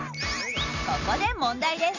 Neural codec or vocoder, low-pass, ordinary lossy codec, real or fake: none; 7.2 kHz; none; real